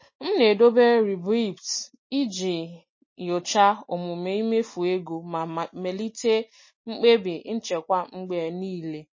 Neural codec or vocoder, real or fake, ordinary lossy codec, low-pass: none; real; MP3, 32 kbps; 7.2 kHz